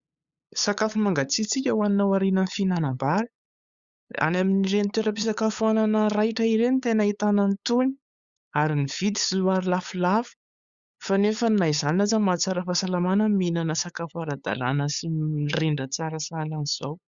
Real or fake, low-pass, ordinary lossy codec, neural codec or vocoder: fake; 7.2 kHz; Opus, 64 kbps; codec, 16 kHz, 8 kbps, FunCodec, trained on LibriTTS, 25 frames a second